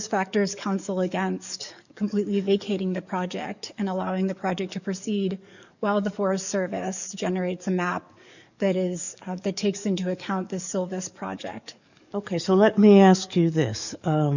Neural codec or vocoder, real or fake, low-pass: codec, 44.1 kHz, 7.8 kbps, DAC; fake; 7.2 kHz